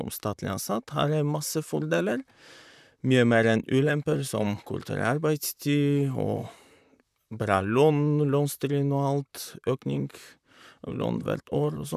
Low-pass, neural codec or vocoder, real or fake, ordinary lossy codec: 14.4 kHz; vocoder, 44.1 kHz, 128 mel bands, Pupu-Vocoder; fake; none